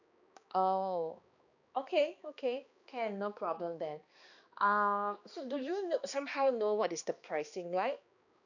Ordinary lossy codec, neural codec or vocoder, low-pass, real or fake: none; codec, 16 kHz, 2 kbps, X-Codec, HuBERT features, trained on balanced general audio; 7.2 kHz; fake